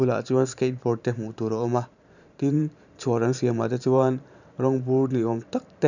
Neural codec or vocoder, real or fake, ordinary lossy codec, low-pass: vocoder, 44.1 kHz, 128 mel bands every 512 samples, BigVGAN v2; fake; none; 7.2 kHz